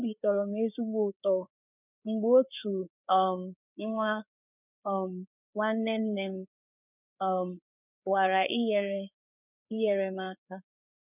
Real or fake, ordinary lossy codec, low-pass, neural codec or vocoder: fake; none; 3.6 kHz; codec, 16 kHz, 4 kbps, FreqCodec, larger model